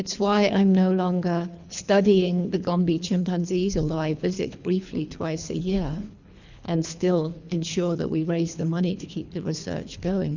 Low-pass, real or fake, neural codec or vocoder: 7.2 kHz; fake; codec, 24 kHz, 3 kbps, HILCodec